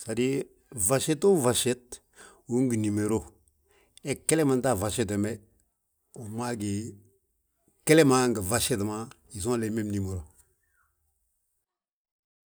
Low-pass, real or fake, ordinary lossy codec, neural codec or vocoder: none; real; none; none